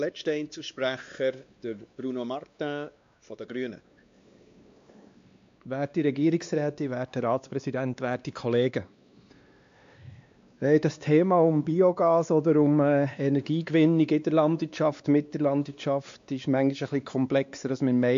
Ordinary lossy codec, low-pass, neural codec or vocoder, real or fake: AAC, 64 kbps; 7.2 kHz; codec, 16 kHz, 2 kbps, X-Codec, WavLM features, trained on Multilingual LibriSpeech; fake